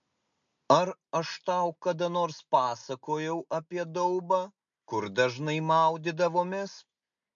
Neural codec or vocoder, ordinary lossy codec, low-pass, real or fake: none; MP3, 64 kbps; 7.2 kHz; real